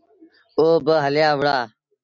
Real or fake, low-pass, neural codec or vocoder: real; 7.2 kHz; none